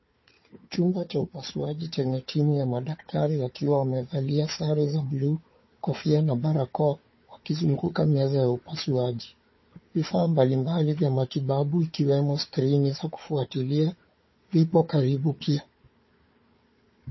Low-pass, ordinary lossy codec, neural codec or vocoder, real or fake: 7.2 kHz; MP3, 24 kbps; codec, 16 kHz, 4 kbps, FunCodec, trained on Chinese and English, 50 frames a second; fake